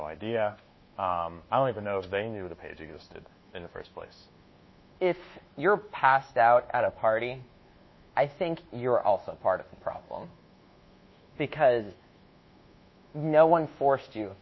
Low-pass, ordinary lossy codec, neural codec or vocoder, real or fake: 7.2 kHz; MP3, 24 kbps; codec, 24 kHz, 1.2 kbps, DualCodec; fake